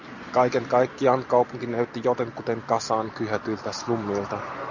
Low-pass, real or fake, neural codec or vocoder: 7.2 kHz; real; none